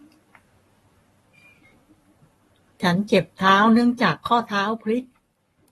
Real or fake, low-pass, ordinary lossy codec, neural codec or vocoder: fake; 19.8 kHz; AAC, 32 kbps; codec, 44.1 kHz, 7.8 kbps, Pupu-Codec